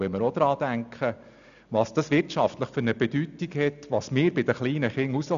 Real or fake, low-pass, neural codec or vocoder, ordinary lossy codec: real; 7.2 kHz; none; none